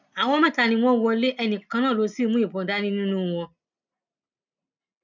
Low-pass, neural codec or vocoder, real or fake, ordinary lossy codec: 7.2 kHz; none; real; none